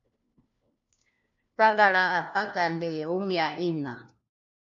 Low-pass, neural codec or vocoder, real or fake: 7.2 kHz; codec, 16 kHz, 1 kbps, FunCodec, trained on LibriTTS, 50 frames a second; fake